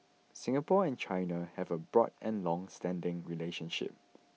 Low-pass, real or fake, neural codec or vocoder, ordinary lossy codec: none; real; none; none